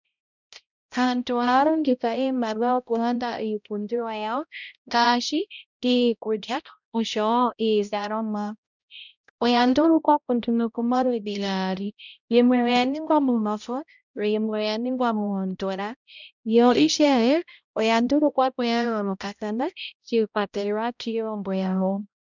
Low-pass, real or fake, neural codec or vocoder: 7.2 kHz; fake; codec, 16 kHz, 0.5 kbps, X-Codec, HuBERT features, trained on balanced general audio